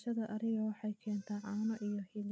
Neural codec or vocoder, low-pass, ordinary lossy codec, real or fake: none; none; none; real